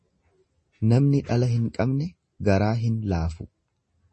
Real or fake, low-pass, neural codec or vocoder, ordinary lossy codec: real; 10.8 kHz; none; MP3, 32 kbps